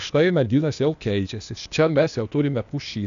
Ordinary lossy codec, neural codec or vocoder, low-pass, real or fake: MP3, 96 kbps; codec, 16 kHz, 0.8 kbps, ZipCodec; 7.2 kHz; fake